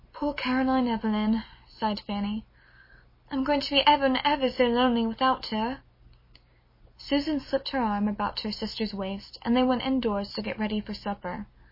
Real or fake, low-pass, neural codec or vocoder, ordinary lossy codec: real; 5.4 kHz; none; MP3, 24 kbps